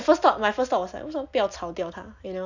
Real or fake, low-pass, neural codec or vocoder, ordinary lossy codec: real; 7.2 kHz; none; none